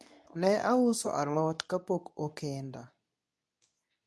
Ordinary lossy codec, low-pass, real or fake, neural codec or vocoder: none; none; fake; codec, 24 kHz, 0.9 kbps, WavTokenizer, medium speech release version 2